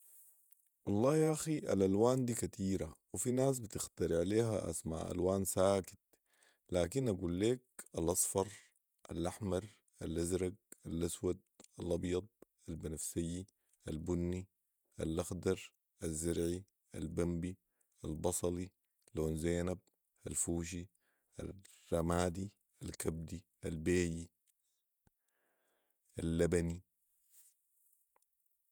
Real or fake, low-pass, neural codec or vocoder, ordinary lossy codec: fake; none; vocoder, 48 kHz, 128 mel bands, Vocos; none